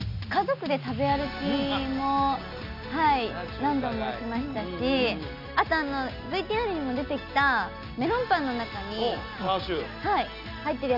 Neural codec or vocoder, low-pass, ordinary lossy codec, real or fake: none; 5.4 kHz; none; real